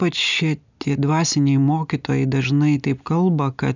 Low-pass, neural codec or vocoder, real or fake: 7.2 kHz; none; real